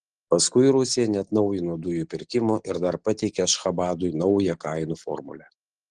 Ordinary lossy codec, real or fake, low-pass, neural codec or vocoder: Opus, 16 kbps; real; 9.9 kHz; none